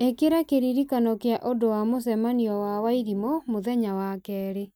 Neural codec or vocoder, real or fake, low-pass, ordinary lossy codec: none; real; none; none